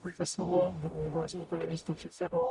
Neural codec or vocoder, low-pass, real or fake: codec, 44.1 kHz, 0.9 kbps, DAC; 10.8 kHz; fake